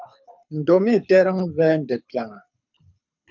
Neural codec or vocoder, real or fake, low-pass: codec, 24 kHz, 6 kbps, HILCodec; fake; 7.2 kHz